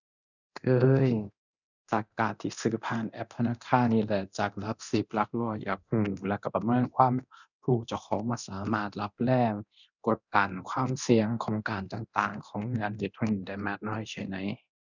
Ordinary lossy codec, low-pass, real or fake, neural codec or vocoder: none; 7.2 kHz; fake; codec, 24 kHz, 0.9 kbps, DualCodec